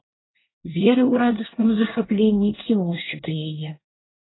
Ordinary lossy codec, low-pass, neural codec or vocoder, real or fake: AAC, 16 kbps; 7.2 kHz; codec, 24 kHz, 1 kbps, SNAC; fake